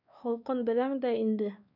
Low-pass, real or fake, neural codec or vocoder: 5.4 kHz; fake; codec, 16 kHz, 2 kbps, X-Codec, WavLM features, trained on Multilingual LibriSpeech